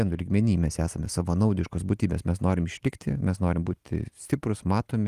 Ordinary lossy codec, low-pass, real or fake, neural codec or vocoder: Opus, 24 kbps; 14.4 kHz; real; none